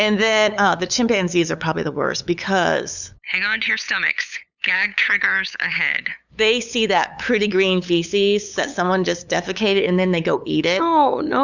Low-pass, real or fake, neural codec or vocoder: 7.2 kHz; fake; codec, 16 kHz, 8 kbps, FunCodec, trained on LibriTTS, 25 frames a second